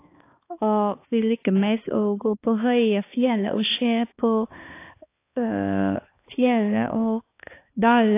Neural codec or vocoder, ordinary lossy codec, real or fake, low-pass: codec, 16 kHz, 2 kbps, X-Codec, HuBERT features, trained on balanced general audio; AAC, 24 kbps; fake; 3.6 kHz